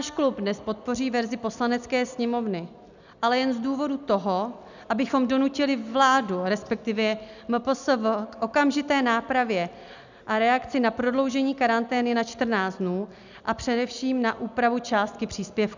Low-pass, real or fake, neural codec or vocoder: 7.2 kHz; real; none